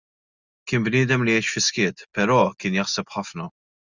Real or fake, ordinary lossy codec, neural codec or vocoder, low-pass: real; Opus, 64 kbps; none; 7.2 kHz